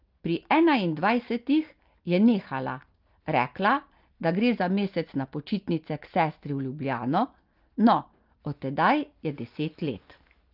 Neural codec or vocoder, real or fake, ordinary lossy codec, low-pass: none; real; Opus, 32 kbps; 5.4 kHz